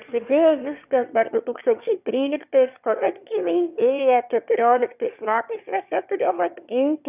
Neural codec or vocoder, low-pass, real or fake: autoencoder, 22.05 kHz, a latent of 192 numbers a frame, VITS, trained on one speaker; 3.6 kHz; fake